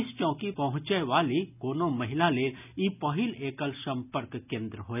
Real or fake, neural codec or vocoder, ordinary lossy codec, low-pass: real; none; none; 3.6 kHz